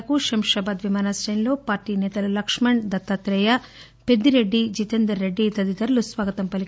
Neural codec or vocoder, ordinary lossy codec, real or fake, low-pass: none; none; real; none